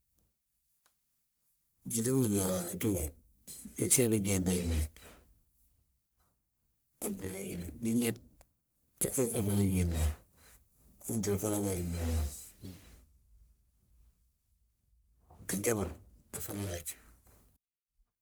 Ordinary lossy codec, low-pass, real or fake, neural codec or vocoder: none; none; fake; codec, 44.1 kHz, 1.7 kbps, Pupu-Codec